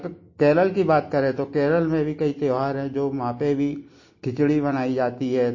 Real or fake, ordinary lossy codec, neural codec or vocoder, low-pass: real; MP3, 32 kbps; none; 7.2 kHz